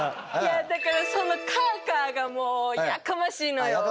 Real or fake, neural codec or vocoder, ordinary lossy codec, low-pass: real; none; none; none